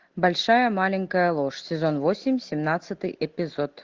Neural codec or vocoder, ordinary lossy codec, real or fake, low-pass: none; Opus, 16 kbps; real; 7.2 kHz